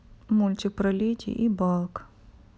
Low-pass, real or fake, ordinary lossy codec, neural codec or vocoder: none; real; none; none